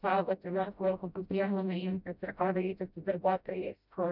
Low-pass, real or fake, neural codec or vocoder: 5.4 kHz; fake; codec, 16 kHz, 0.5 kbps, FreqCodec, smaller model